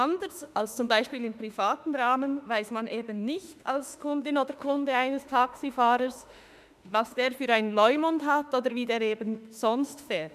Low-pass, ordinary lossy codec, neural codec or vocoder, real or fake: 14.4 kHz; none; autoencoder, 48 kHz, 32 numbers a frame, DAC-VAE, trained on Japanese speech; fake